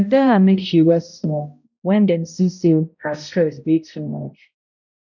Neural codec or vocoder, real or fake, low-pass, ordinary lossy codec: codec, 16 kHz, 0.5 kbps, X-Codec, HuBERT features, trained on balanced general audio; fake; 7.2 kHz; none